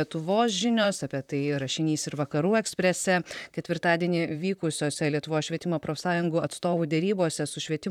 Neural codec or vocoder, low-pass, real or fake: vocoder, 44.1 kHz, 128 mel bands, Pupu-Vocoder; 19.8 kHz; fake